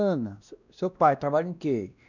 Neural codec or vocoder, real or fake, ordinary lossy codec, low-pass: codec, 16 kHz, 1 kbps, X-Codec, WavLM features, trained on Multilingual LibriSpeech; fake; none; 7.2 kHz